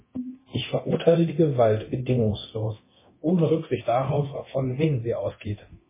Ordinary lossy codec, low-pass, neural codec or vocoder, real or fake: MP3, 16 kbps; 3.6 kHz; codec, 24 kHz, 0.9 kbps, DualCodec; fake